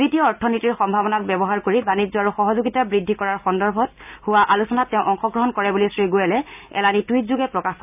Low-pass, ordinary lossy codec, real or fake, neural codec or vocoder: 3.6 kHz; none; real; none